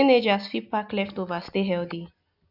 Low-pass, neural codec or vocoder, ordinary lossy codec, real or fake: 5.4 kHz; none; none; real